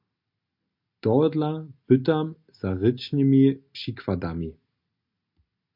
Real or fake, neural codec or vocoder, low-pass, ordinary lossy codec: real; none; 5.4 kHz; MP3, 48 kbps